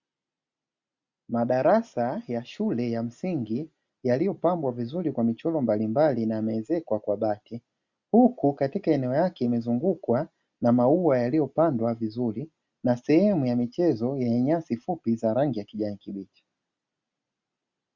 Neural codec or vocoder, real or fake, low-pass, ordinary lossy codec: none; real; 7.2 kHz; Opus, 64 kbps